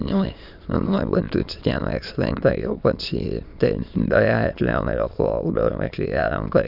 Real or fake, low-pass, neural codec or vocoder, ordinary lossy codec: fake; 5.4 kHz; autoencoder, 22.05 kHz, a latent of 192 numbers a frame, VITS, trained on many speakers; none